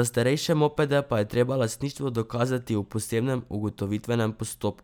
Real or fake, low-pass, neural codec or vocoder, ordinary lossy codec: real; none; none; none